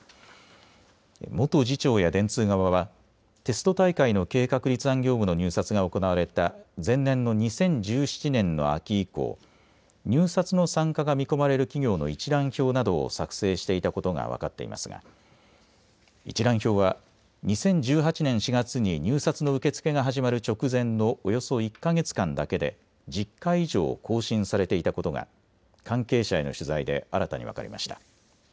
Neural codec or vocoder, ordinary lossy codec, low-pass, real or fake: none; none; none; real